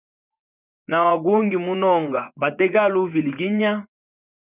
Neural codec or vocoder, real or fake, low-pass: vocoder, 24 kHz, 100 mel bands, Vocos; fake; 3.6 kHz